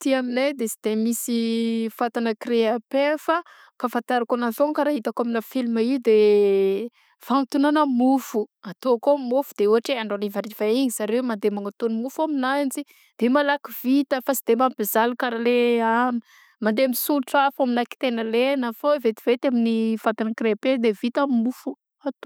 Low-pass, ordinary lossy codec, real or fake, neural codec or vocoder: none; none; real; none